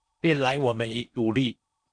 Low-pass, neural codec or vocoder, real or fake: 9.9 kHz; codec, 16 kHz in and 24 kHz out, 0.8 kbps, FocalCodec, streaming, 65536 codes; fake